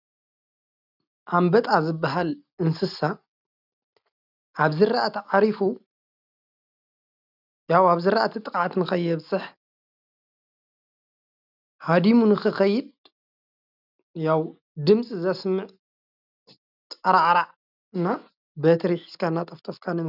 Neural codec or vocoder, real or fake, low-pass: none; real; 5.4 kHz